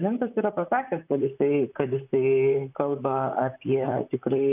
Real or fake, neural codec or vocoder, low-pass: fake; vocoder, 44.1 kHz, 128 mel bands, Pupu-Vocoder; 3.6 kHz